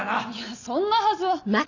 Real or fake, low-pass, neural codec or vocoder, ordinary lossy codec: real; 7.2 kHz; none; none